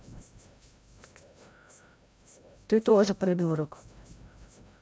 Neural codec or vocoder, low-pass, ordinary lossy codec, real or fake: codec, 16 kHz, 0.5 kbps, FreqCodec, larger model; none; none; fake